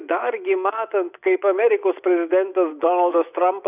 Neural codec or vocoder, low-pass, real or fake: vocoder, 24 kHz, 100 mel bands, Vocos; 3.6 kHz; fake